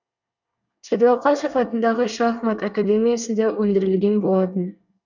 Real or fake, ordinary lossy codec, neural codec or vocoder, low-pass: fake; none; codec, 24 kHz, 1 kbps, SNAC; 7.2 kHz